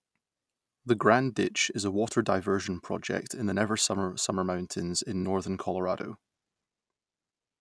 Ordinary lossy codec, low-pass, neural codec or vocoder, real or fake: none; none; none; real